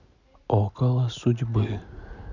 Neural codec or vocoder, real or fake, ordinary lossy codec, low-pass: none; real; none; 7.2 kHz